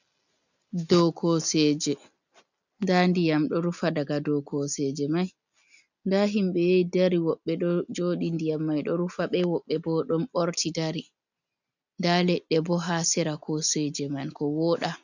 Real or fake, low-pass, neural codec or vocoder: real; 7.2 kHz; none